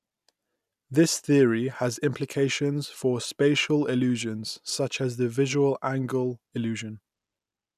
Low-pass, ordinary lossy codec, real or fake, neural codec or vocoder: 14.4 kHz; none; real; none